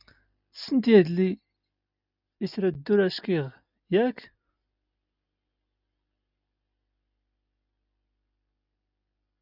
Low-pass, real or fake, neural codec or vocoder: 5.4 kHz; real; none